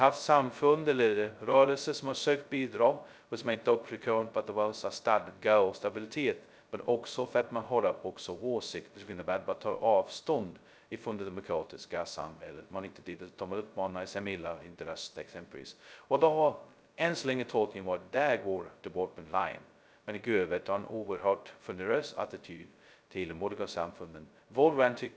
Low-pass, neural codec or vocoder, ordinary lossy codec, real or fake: none; codec, 16 kHz, 0.2 kbps, FocalCodec; none; fake